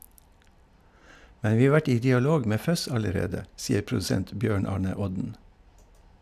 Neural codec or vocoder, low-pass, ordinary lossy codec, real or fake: none; 14.4 kHz; none; real